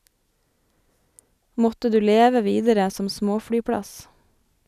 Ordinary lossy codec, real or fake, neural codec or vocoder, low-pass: none; real; none; 14.4 kHz